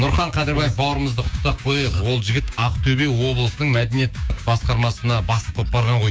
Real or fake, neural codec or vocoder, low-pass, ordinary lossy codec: fake; codec, 16 kHz, 6 kbps, DAC; none; none